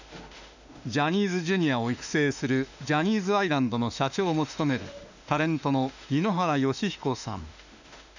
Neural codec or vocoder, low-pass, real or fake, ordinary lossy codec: autoencoder, 48 kHz, 32 numbers a frame, DAC-VAE, trained on Japanese speech; 7.2 kHz; fake; none